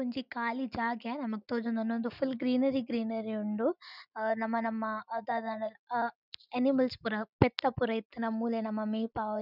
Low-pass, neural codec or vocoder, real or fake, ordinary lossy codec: 5.4 kHz; none; real; none